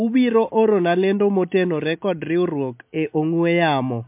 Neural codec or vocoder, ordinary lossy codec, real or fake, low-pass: none; MP3, 32 kbps; real; 3.6 kHz